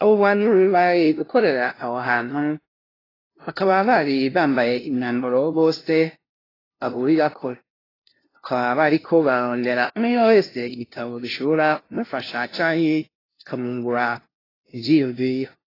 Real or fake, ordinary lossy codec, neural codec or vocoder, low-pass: fake; AAC, 24 kbps; codec, 16 kHz, 0.5 kbps, FunCodec, trained on LibriTTS, 25 frames a second; 5.4 kHz